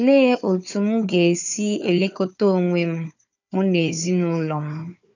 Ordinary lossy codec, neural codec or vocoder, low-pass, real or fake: none; codec, 16 kHz, 4 kbps, FunCodec, trained on Chinese and English, 50 frames a second; 7.2 kHz; fake